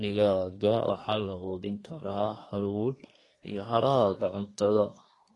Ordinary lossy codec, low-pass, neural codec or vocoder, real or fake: AAC, 32 kbps; 10.8 kHz; codec, 24 kHz, 1 kbps, SNAC; fake